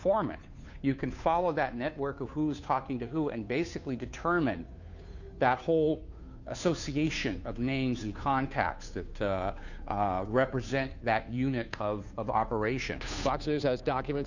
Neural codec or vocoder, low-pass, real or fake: codec, 16 kHz, 2 kbps, FunCodec, trained on Chinese and English, 25 frames a second; 7.2 kHz; fake